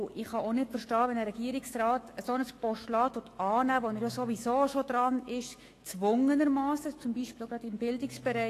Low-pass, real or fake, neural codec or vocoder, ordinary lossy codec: 14.4 kHz; fake; autoencoder, 48 kHz, 128 numbers a frame, DAC-VAE, trained on Japanese speech; AAC, 48 kbps